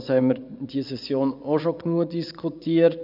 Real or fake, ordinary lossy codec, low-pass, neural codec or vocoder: fake; none; 5.4 kHz; vocoder, 24 kHz, 100 mel bands, Vocos